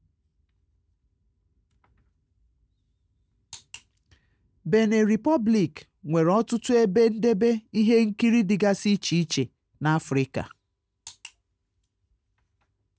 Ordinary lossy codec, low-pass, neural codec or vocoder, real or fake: none; none; none; real